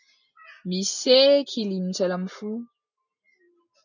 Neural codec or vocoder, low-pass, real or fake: none; 7.2 kHz; real